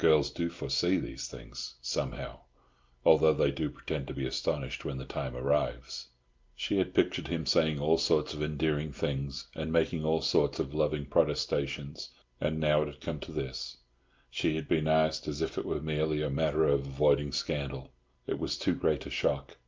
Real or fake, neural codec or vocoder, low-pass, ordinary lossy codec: real; none; 7.2 kHz; Opus, 32 kbps